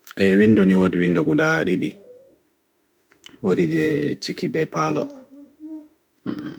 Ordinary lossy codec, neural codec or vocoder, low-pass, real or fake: none; autoencoder, 48 kHz, 32 numbers a frame, DAC-VAE, trained on Japanese speech; none; fake